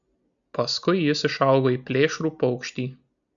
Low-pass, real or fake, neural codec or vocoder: 7.2 kHz; real; none